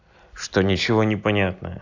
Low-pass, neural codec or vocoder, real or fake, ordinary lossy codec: 7.2 kHz; none; real; AAC, 48 kbps